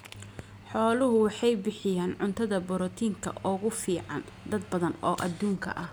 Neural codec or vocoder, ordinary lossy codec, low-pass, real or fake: none; none; none; real